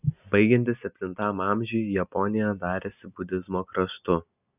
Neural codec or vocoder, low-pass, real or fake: none; 3.6 kHz; real